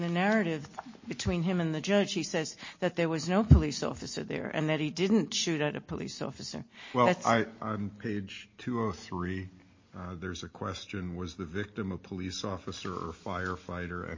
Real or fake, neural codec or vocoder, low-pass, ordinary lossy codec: real; none; 7.2 kHz; MP3, 32 kbps